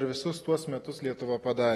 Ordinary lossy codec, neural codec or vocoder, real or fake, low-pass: AAC, 32 kbps; none; real; 19.8 kHz